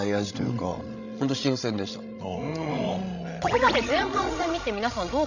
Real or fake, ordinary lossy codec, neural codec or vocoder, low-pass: fake; none; codec, 16 kHz, 16 kbps, FreqCodec, larger model; 7.2 kHz